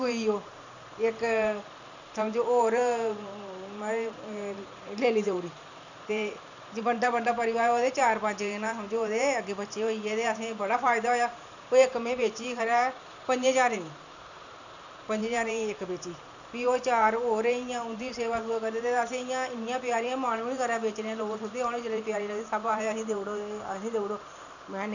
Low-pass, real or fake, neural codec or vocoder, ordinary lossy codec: 7.2 kHz; fake; vocoder, 44.1 kHz, 128 mel bands every 512 samples, BigVGAN v2; none